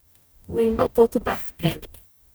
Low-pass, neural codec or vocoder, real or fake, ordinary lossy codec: none; codec, 44.1 kHz, 0.9 kbps, DAC; fake; none